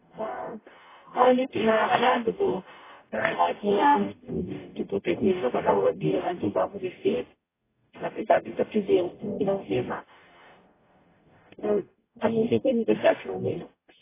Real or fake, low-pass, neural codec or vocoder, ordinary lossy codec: fake; 3.6 kHz; codec, 44.1 kHz, 0.9 kbps, DAC; AAC, 16 kbps